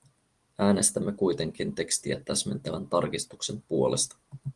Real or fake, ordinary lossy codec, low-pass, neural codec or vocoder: real; Opus, 24 kbps; 10.8 kHz; none